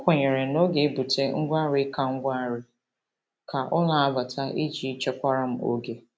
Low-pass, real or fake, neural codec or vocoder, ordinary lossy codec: none; real; none; none